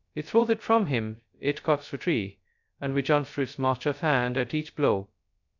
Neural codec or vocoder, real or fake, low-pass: codec, 16 kHz, 0.2 kbps, FocalCodec; fake; 7.2 kHz